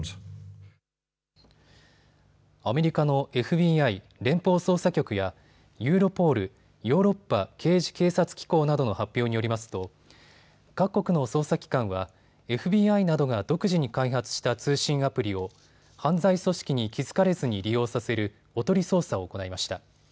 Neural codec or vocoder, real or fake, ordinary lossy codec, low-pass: none; real; none; none